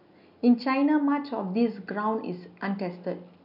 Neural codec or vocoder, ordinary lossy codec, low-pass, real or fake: none; none; 5.4 kHz; real